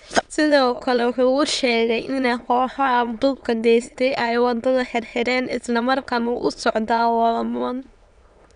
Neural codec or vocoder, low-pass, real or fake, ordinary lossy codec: autoencoder, 22.05 kHz, a latent of 192 numbers a frame, VITS, trained on many speakers; 9.9 kHz; fake; none